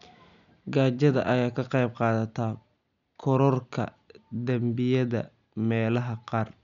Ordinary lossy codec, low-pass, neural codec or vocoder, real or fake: none; 7.2 kHz; none; real